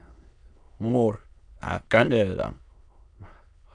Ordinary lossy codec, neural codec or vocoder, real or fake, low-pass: MP3, 96 kbps; autoencoder, 22.05 kHz, a latent of 192 numbers a frame, VITS, trained on many speakers; fake; 9.9 kHz